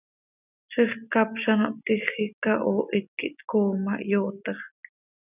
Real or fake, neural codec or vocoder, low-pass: real; none; 3.6 kHz